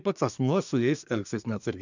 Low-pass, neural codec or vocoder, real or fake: 7.2 kHz; codec, 24 kHz, 1 kbps, SNAC; fake